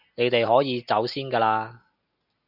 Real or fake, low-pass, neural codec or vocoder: real; 5.4 kHz; none